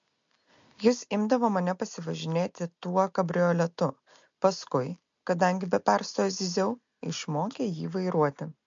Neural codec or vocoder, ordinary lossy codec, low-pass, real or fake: none; MP3, 48 kbps; 7.2 kHz; real